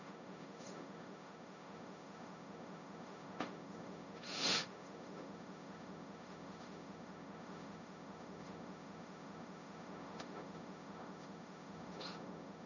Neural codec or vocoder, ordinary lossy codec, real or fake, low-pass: codec, 16 kHz, 1.1 kbps, Voila-Tokenizer; none; fake; 7.2 kHz